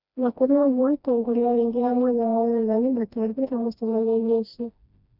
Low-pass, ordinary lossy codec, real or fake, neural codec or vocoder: 5.4 kHz; none; fake; codec, 16 kHz, 1 kbps, FreqCodec, smaller model